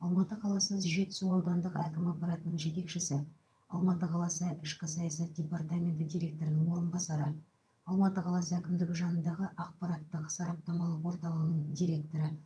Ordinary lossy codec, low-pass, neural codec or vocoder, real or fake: none; none; vocoder, 22.05 kHz, 80 mel bands, HiFi-GAN; fake